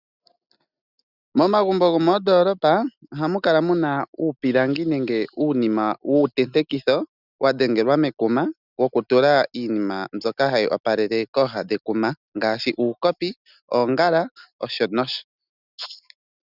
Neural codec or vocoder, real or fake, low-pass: none; real; 5.4 kHz